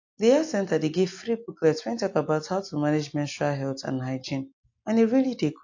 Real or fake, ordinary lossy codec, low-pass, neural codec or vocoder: real; AAC, 48 kbps; 7.2 kHz; none